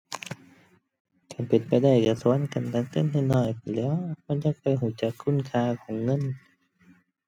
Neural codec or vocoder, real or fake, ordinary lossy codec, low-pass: none; real; none; 19.8 kHz